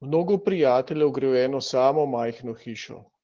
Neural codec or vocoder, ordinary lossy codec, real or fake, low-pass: none; Opus, 16 kbps; real; 7.2 kHz